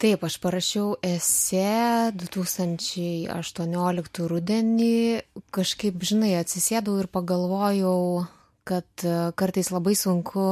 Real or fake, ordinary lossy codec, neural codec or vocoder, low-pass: real; MP3, 64 kbps; none; 14.4 kHz